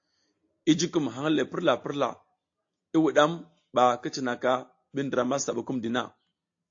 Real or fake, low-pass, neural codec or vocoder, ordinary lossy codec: real; 7.2 kHz; none; AAC, 48 kbps